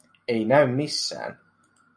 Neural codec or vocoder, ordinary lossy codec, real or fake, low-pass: none; MP3, 96 kbps; real; 9.9 kHz